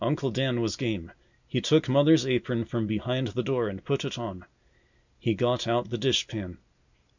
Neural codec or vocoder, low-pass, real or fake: none; 7.2 kHz; real